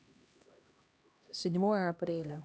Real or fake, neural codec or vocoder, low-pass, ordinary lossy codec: fake; codec, 16 kHz, 1 kbps, X-Codec, HuBERT features, trained on LibriSpeech; none; none